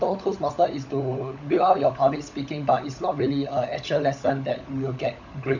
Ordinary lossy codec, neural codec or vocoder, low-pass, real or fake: none; codec, 16 kHz, 16 kbps, FunCodec, trained on LibriTTS, 50 frames a second; 7.2 kHz; fake